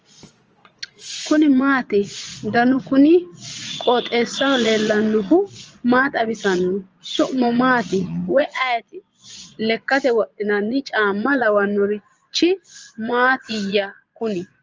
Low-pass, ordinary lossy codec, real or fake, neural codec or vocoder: 7.2 kHz; Opus, 24 kbps; fake; vocoder, 22.05 kHz, 80 mel bands, Vocos